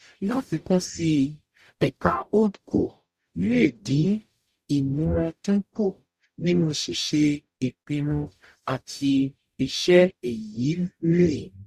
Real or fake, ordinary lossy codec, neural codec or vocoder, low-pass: fake; Opus, 64 kbps; codec, 44.1 kHz, 0.9 kbps, DAC; 14.4 kHz